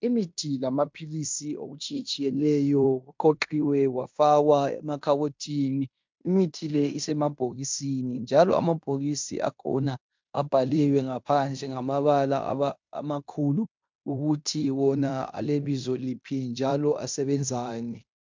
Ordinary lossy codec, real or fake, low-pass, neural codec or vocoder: MP3, 64 kbps; fake; 7.2 kHz; codec, 16 kHz in and 24 kHz out, 0.9 kbps, LongCat-Audio-Codec, fine tuned four codebook decoder